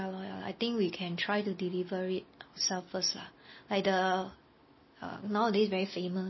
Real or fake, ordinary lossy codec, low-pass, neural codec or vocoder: real; MP3, 24 kbps; 7.2 kHz; none